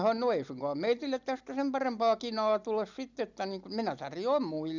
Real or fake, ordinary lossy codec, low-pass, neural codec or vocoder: real; none; 7.2 kHz; none